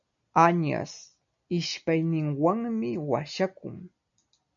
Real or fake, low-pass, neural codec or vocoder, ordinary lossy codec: real; 7.2 kHz; none; MP3, 96 kbps